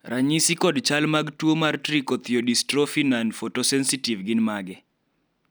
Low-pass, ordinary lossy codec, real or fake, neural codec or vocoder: none; none; real; none